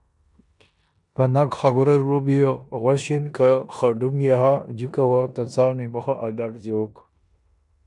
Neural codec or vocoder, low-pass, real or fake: codec, 16 kHz in and 24 kHz out, 0.9 kbps, LongCat-Audio-Codec, four codebook decoder; 10.8 kHz; fake